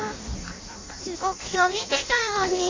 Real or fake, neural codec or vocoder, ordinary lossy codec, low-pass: fake; codec, 16 kHz in and 24 kHz out, 0.6 kbps, FireRedTTS-2 codec; AAC, 32 kbps; 7.2 kHz